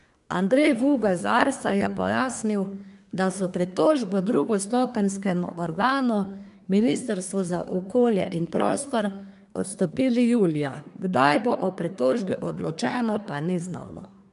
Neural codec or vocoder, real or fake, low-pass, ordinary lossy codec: codec, 24 kHz, 1 kbps, SNAC; fake; 10.8 kHz; none